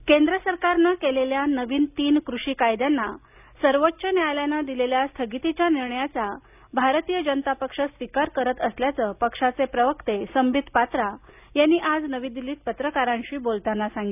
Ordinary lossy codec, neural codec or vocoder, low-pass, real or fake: none; none; 3.6 kHz; real